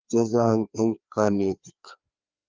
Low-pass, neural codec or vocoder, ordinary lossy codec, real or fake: 7.2 kHz; codec, 16 kHz, 2 kbps, FreqCodec, larger model; Opus, 32 kbps; fake